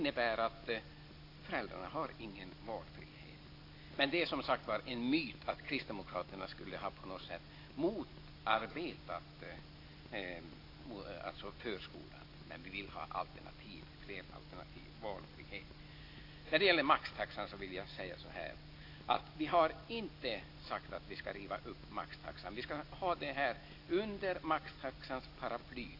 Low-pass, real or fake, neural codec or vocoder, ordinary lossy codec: 5.4 kHz; real; none; AAC, 32 kbps